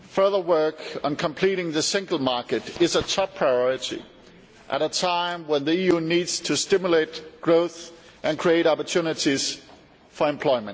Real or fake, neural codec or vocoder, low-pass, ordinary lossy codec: real; none; none; none